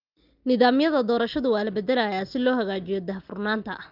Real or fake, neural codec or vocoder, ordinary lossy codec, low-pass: real; none; Opus, 32 kbps; 5.4 kHz